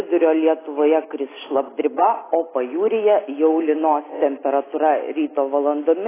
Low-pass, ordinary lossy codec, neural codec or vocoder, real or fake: 3.6 kHz; AAC, 16 kbps; none; real